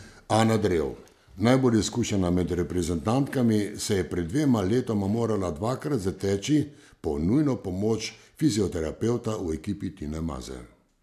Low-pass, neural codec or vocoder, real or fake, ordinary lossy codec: 14.4 kHz; none; real; MP3, 96 kbps